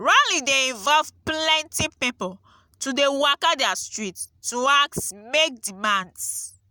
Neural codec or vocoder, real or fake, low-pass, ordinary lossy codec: none; real; none; none